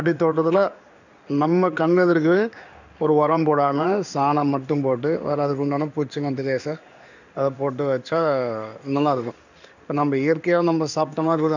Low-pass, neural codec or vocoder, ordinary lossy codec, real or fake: 7.2 kHz; codec, 16 kHz in and 24 kHz out, 1 kbps, XY-Tokenizer; none; fake